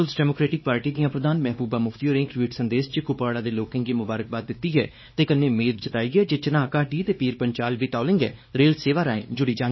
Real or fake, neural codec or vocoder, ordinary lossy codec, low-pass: fake; codec, 16 kHz, 2 kbps, X-Codec, WavLM features, trained on Multilingual LibriSpeech; MP3, 24 kbps; 7.2 kHz